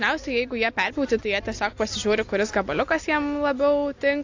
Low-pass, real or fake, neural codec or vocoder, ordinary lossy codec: 7.2 kHz; real; none; AAC, 48 kbps